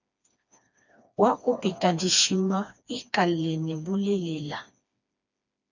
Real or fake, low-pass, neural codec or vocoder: fake; 7.2 kHz; codec, 16 kHz, 2 kbps, FreqCodec, smaller model